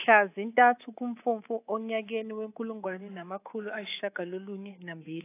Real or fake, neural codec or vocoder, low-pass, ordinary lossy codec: fake; codec, 24 kHz, 3.1 kbps, DualCodec; 3.6 kHz; AAC, 24 kbps